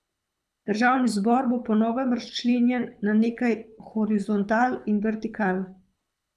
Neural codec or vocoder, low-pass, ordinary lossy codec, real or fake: codec, 24 kHz, 6 kbps, HILCodec; none; none; fake